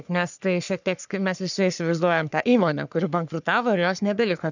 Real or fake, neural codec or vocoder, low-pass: fake; codec, 44.1 kHz, 3.4 kbps, Pupu-Codec; 7.2 kHz